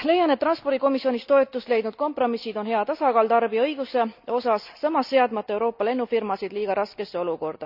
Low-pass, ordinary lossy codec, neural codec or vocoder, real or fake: 5.4 kHz; none; none; real